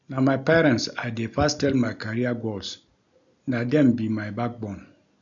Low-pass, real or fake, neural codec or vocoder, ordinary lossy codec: 7.2 kHz; real; none; none